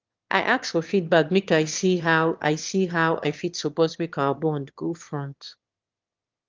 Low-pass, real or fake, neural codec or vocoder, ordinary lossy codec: 7.2 kHz; fake; autoencoder, 22.05 kHz, a latent of 192 numbers a frame, VITS, trained on one speaker; Opus, 32 kbps